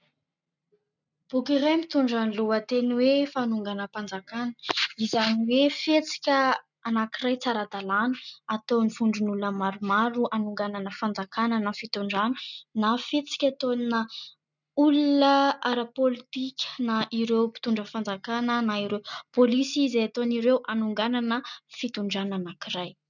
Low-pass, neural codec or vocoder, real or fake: 7.2 kHz; none; real